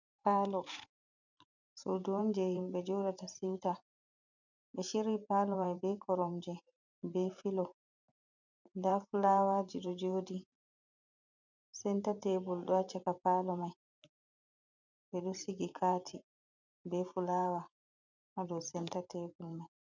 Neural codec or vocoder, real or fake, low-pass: vocoder, 44.1 kHz, 128 mel bands every 512 samples, BigVGAN v2; fake; 7.2 kHz